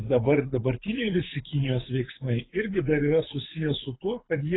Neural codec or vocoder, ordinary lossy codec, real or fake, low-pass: codec, 24 kHz, 6 kbps, HILCodec; AAC, 16 kbps; fake; 7.2 kHz